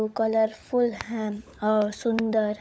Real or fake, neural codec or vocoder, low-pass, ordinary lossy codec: fake; codec, 16 kHz, 16 kbps, FunCodec, trained on LibriTTS, 50 frames a second; none; none